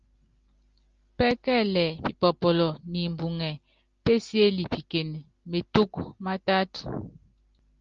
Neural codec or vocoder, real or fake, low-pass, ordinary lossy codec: none; real; 7.2 kHz; Opus, 32 kbps